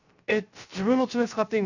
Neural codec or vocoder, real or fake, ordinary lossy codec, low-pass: codec, 16 kHz, 0.3 kbps, FocalCodec; fake; none; 7.2 kHz